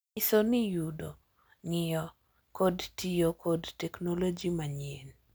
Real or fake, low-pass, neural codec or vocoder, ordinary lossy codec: real; none; none; none